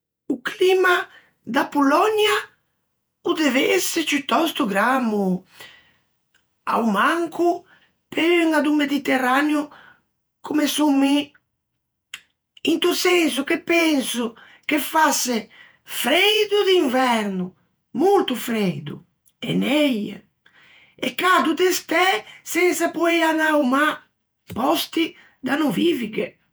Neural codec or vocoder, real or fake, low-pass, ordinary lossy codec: vocoder, 48 kHz, 128 mel bands, Vocos; fake; none; none